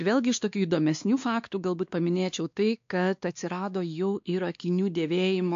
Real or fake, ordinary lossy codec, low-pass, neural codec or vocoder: fake; AAC, 64 kbps; 7.2 kHz; codec, 16 kHz, 2 kbps, X-Codec, WavLM features, trained on Multilingual LibriSpeech